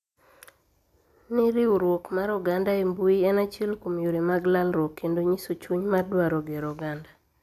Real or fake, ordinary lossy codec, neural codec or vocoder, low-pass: real; AAC, 96 kbps; none; 14.4 kHz